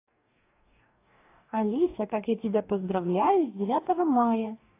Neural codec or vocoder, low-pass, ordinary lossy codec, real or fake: codec, 44.1 kHz, 2.6 kbps, DAC; 3.6 kHz; AAC, 24 kbps; fake